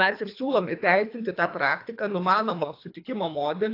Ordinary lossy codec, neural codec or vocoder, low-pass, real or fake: AAC, 32 kbps; codec, 24 kHz, 3 kbps, HILCodec; 5.4 kHz; fake